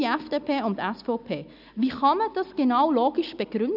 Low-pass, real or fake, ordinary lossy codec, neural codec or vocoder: 5.4 kHz; real; none; none